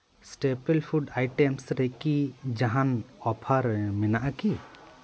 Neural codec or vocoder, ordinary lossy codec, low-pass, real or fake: none; none; none; real